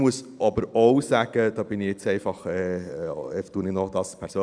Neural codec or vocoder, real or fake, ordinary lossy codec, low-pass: none; real; none; 9.9 kHz